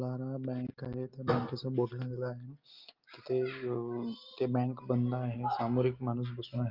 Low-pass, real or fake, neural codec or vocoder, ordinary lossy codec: 5.4 kHz; real; none; Opus, 32 kbps